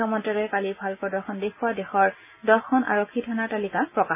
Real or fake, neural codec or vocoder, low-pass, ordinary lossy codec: real; none; 3.6 kHz; none